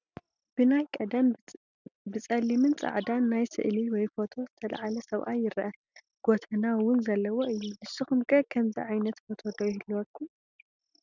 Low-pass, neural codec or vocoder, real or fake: 7.2 kHz; none; real